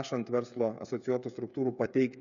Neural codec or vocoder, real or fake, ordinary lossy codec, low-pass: codec, 16 kHz, 8 kbps, FreqCodec, smaller model; fake; MP3, 96 kbps; 7.2 kHz